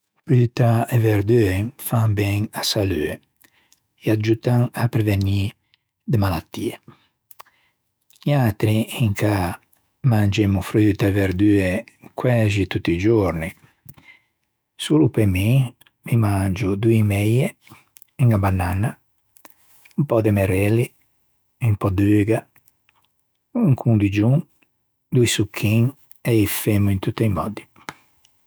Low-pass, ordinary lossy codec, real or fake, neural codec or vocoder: none; none; fake; autoencoder, 48 kHz, 128 numbers a frame, DAC-VAE, trained on Japanese speech